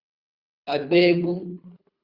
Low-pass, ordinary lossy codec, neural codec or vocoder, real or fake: 5.4 kHz; Opus, 64 kbps; codec, 24 kHz, 3 kbps, HILCodec; fake